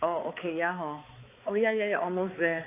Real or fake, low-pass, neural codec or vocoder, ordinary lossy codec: fake; 3.6 kHz; codec, 16 kHz, 4 kbps, X-Codec, HuBERT features, trained on balanced general audio; AAC, 24 kbps